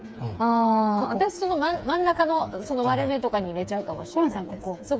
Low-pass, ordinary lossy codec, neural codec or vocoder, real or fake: none; none; codec, 16 kHz, 4 kbps, FreqCodec, smaller model; fake